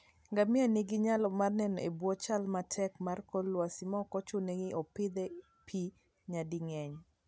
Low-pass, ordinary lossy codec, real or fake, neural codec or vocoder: none; none; real; none